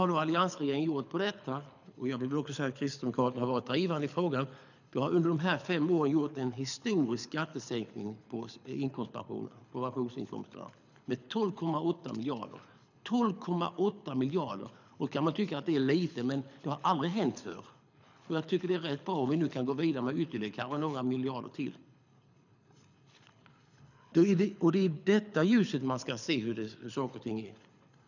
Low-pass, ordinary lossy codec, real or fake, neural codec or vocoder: 7.2 kHz; none; fake; codec, 24 kHz, 6 kbps, HILCodec